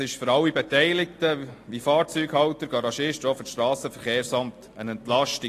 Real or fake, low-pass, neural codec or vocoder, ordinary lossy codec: real; 14.4 kHz; none; AAC, 48 kbps